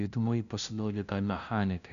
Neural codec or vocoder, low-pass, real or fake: codec, 16 kHz, 0.5 kbps, FunCodec, trained on LibriTTS, 25 frames a second; 7.2 kHz; fake